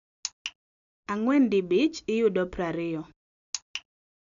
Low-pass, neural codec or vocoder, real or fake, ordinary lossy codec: 7.2 kHz; none; real; none